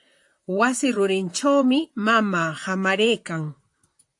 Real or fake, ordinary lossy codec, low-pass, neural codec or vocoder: fake; AAC, 64 kbps; 10.8 kHz; vocoder, 44.1 kHz, 128 mel bands, Pupu-Vocoder